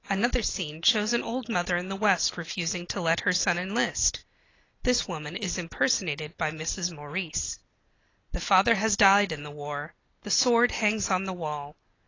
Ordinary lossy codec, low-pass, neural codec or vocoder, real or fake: AAC, 32 kbps; 7.2 kHz; none; real